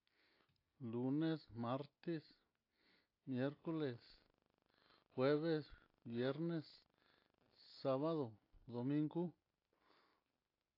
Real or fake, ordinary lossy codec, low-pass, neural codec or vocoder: real; AAC, 32 kbps; 5.4 kHz; none